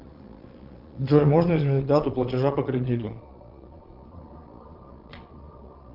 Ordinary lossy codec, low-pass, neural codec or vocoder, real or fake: Opus, 32 kbps; 5.4 kHz; vocoder, 22.05 kHz, 80 mel bands, Vocos; fake